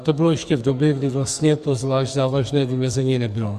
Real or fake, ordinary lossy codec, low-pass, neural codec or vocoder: fake; Opus, 64 kbps; 14.4 kHz; codec, 44.1 kHz, 2.6 kbps, SNAC